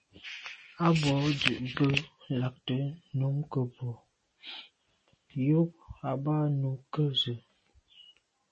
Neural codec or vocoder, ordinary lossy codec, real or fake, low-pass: none; MP3, 32 kbps; real; 10.8 kHz